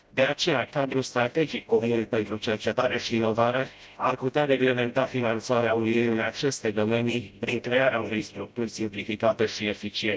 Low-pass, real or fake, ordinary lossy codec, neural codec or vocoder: none; fake; none; codec, 16 kHz, 0.5 kbps, FreqCodec, smaller model